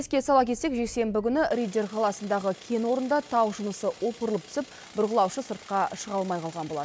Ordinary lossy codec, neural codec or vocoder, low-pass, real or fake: none; none; none; real